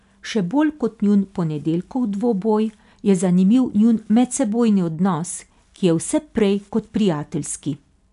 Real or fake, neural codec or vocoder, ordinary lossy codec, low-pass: real; none; none; 10.8 kHz